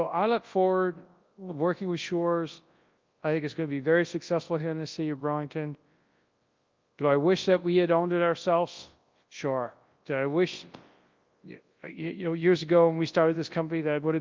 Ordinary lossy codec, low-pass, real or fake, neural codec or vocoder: Opus, 32 kbps; 7.2 kHz; fake; codec, 24 kHz, 0.9 kbps, WavTokenizer, large speech release